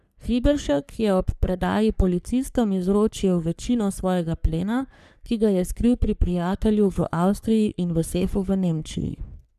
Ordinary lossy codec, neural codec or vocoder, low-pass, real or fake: none; codec, 44.1 kHz, 3.4 kbps, Pupu-Codec; 14.4 kHz; fake